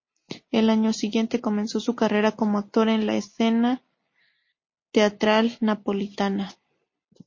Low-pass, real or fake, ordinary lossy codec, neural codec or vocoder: 7.2 kHz; real; MP3, 32 kbps; none